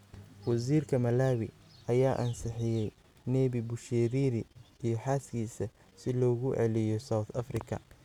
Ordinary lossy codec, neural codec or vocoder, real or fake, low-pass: none; none; real; 19.8 kHz